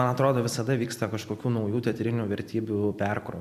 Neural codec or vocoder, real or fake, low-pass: vocoder, 44.1 kHz, 128 mel bands every 256 samples, BigVGAN v2; fake; 14.4 kHz